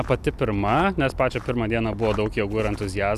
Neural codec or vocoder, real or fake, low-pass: none; real; 14.4 kHz